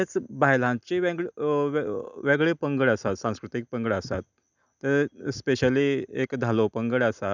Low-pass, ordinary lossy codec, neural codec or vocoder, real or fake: 7.2 kHz; none; none; real